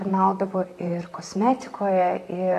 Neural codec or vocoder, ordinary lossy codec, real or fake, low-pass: vocoder, 44.1 kHz, 128 mel bands, Pupu-Vocoder; AAC, 48 kbps; fake; 14.4 kHz